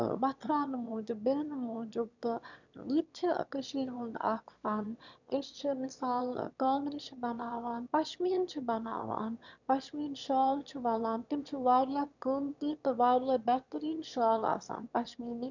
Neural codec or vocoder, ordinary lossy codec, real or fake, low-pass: autoencoder, 22.05 kHz, a latent of 192 numbers a frame, VITS, trained on one speaker; none; fake; 7.2 kHz